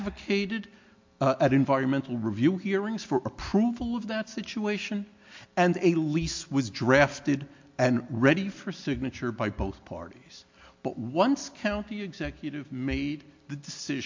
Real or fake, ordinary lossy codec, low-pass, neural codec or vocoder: real; MP3, 48 kbps; 7.2 kHz; none